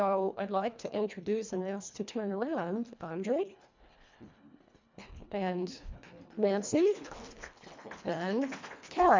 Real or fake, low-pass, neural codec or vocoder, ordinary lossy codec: fake; 7.2 kHz; codec, 24 kHz, 1.5 kbps, HILCodec; MP3, 64 kbps